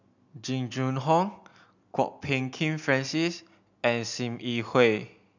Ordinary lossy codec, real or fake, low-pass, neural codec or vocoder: none; real; 7.2 kHz; none